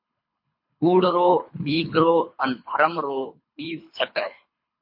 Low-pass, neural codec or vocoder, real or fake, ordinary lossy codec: 5.4 kHz; codec, 24 kHz, 3 kbps, HILCodec; fake; MP3, 32 kbps